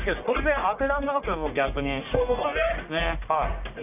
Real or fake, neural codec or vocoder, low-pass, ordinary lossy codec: fake; codec, 44.1 kHz, 1.7 kbps, Pupu-Codec; 3.6 kHz; none